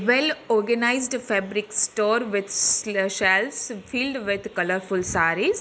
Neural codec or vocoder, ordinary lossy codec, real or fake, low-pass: none; none; real; none